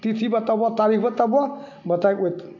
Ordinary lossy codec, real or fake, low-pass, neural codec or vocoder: MP3, 48 kbps; real; 7.2 kHz; none